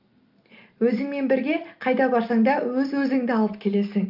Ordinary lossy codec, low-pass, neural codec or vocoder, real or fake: Opus, 24 kbps; 5.4 kHz; none; real